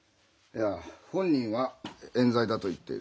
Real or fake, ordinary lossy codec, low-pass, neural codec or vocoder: real; none; none; none